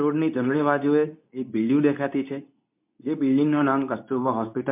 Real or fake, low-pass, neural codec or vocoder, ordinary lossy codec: fake; 3.6 kHz; codec, 24 kHz, 0.9 kbps, WavTokenizer, medium speech release version 2; MP3, 32 kbps